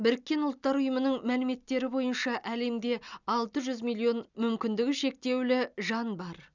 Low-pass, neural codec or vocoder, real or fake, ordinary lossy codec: 7.2 kHz; none; real; none